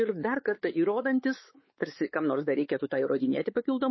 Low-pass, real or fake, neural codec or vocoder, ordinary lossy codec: 7.2 kHz; fake; codec, 16 kHz, 4 kbps, X-Codec, WavLM features, trained on Multilingual LibriSpeech; MP3, 24 kbps